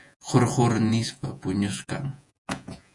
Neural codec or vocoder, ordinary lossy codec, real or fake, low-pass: vocoder, 48 kHz, 128 mel bands, Vocos; MP3, 96 kbps; fake; 10.8 kHz